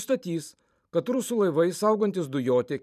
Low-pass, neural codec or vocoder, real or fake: 14.4 kHz; vocoder, 44.1 kHz, 128 mel bands every 512 samples, BigVGAN v2; fake